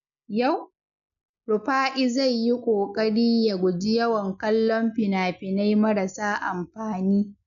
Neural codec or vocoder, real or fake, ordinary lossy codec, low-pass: none; real; none; 7.2 kHz